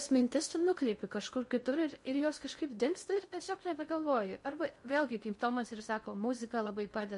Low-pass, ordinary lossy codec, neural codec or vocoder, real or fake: 10.8 kHz; MP3, 48 kbps; codec, 16 kHz in and 24 kHz out, 0.6 kbps, FocalCodec, streaming, 2048 codes; fake